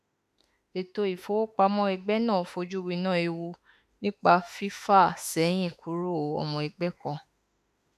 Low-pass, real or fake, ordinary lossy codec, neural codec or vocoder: 14.4 kHz; fake; AAC, 96 kbps; autoencoder, 48 kHz, 32 numbers a frame, DAC-VAE, trained on Japanese speech